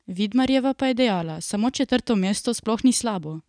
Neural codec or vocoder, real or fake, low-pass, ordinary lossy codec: none; real; 9.9 kHz; none